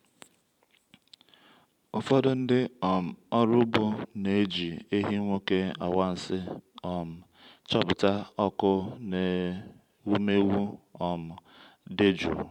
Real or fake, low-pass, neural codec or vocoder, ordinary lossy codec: fake; 19.8 kHz; vocoder, 44.1 kHz, 128 mel bands every 512 samples, BigVGAN v2; none